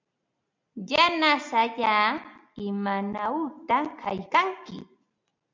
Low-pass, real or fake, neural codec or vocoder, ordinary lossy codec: 7.2 kHz; real; none; MP3, 64 kbps